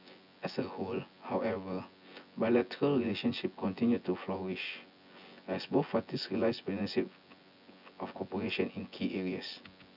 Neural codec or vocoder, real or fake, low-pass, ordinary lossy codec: vocoder, 24 kHz, 100 mel bands, Vocos; fake; 5.4 kHz; none